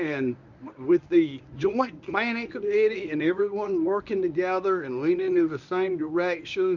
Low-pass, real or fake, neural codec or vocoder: 7.2 kHz; fake; codec, 24 kHz, 0.9 kbps, WavTokenizer, medium speech release version 1